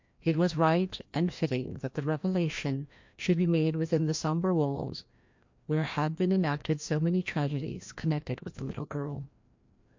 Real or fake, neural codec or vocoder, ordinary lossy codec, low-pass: fake; codec, 16 kHz, 1 kbps, FreqCodec, larger model; MP3, 48 kbps; 7.2 kHz